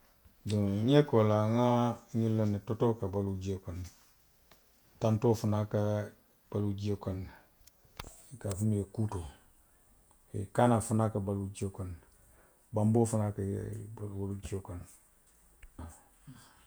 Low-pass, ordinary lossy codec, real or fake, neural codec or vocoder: none; none; fake; vocoder, 48 kHz, 128 mel bands, Vocos